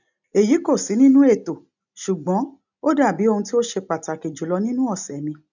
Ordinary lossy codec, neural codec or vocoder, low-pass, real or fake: none; none; 7.2 kHz; real